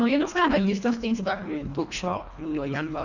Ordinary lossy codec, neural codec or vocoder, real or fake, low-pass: none; codec, 24 kHz, 1.5 kbps, HILCodec; fake; 7.2 kHz